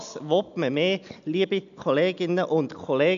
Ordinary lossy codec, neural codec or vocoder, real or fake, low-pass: none; none; real; 7.2 kHz